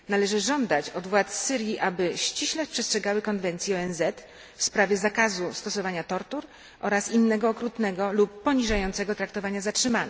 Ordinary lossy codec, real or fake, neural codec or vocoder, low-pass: none; real; none; none